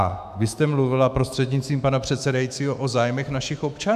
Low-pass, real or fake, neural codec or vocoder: 14.4 kHz; fake; autoencoder, 48 kHz, 128 numbers a frame, DAC-VAE, trained on Japanese speech